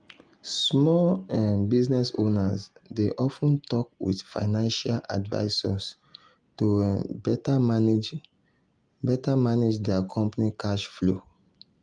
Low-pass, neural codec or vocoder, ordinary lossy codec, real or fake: 9.9 kHz; none; Opus, 32 kbps; real